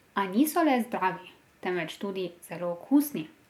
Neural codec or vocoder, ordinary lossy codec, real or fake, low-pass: none; MP3, 96 kbps; real; 19.8 kHz